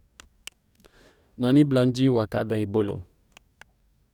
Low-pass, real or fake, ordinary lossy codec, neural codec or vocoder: 19.8 kHz; fake; none; codec, 44.1 kHz, 2.6 kbps, DAC